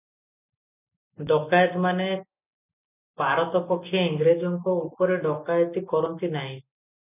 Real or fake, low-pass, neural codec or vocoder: real; 3.6 kHz; none